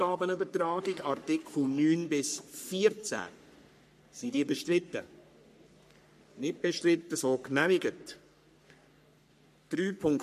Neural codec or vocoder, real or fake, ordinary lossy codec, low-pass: codec, 44.1 kHz, 3.4 kbps, Pupu-Codec; fake; MP3, 64 kbps; 14.4 kHz